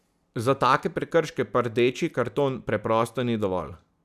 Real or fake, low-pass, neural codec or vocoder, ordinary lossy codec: real; 14.4 kHz; none; none